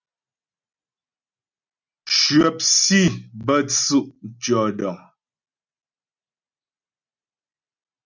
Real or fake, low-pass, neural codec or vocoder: real; 7.2 kHz; none